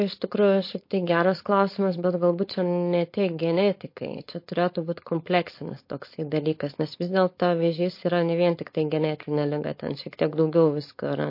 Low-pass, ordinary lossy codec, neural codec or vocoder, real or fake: 5.4 kHz; MP3, 32 kbps; codec, 16 kHz, 4.8 kbps, FACodec; fake